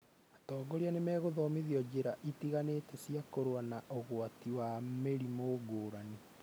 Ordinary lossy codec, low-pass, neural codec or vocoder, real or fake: none; none; none; real